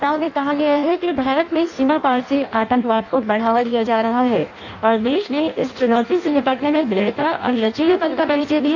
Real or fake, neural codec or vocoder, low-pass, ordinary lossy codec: fake; codec, 16 kHz in and 24 kHz out, 0.6 kbps, FireRedTTS-2 codec; 7.2 kHz; none